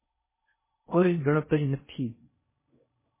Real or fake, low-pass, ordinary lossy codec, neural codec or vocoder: fake; 3.6 kHz; MP3, 16 kbps; codec, 16 kHz in and 24 kHz out, 0.6 kbps, FocalCodec, streaming, 4096 codes